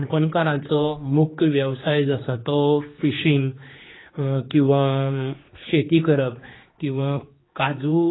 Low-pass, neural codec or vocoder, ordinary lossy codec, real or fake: 7.2 kHz; codec, 16 kHz, 2 kbps, X-Codec, HuBERT features, trained on general audio; AAC, 16 kbps; fake